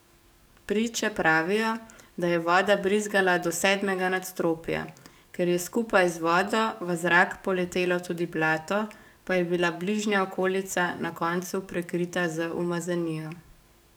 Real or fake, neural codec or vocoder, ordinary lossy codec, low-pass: fake; codec, 44.1 kHz, 7.8 kbps, DAC; none; none